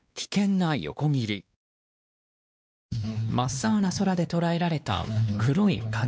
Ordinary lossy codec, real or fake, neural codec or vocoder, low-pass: none; fake; codec, 16 kHz, 2 kbps, X-Codec, WavLM features, trained on Multilingual LibriSpeech; none